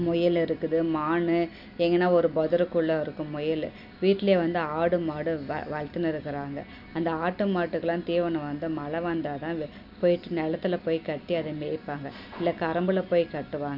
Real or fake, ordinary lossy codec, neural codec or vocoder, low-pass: real; none; none; 5.4 kHz